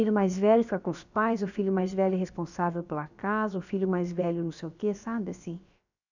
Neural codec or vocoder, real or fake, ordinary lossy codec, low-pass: codec, 16 kHz, about 1 kbps, DyCAST, with the encoder's durations; fake; none; 7.2 kHz